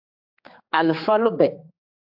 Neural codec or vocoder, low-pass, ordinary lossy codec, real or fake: codec, 16 kHz, 4 kbps, X-Codec, HuBERT features, trained on general audio; 5.4 kHz; AAC, 48 kbps; fake